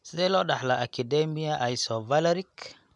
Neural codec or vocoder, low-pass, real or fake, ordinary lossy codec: none; 10.8 kHz; real; none